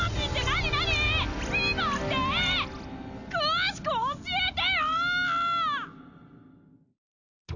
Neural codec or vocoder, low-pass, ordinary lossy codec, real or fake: none; 7.2 kHz; none; real